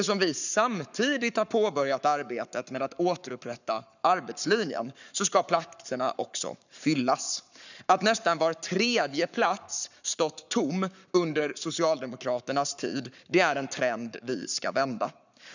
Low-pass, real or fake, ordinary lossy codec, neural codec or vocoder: 7.2 kHz; fake; none; codec, 44.1 kHz, 7.8 kbps, Pupu-Codec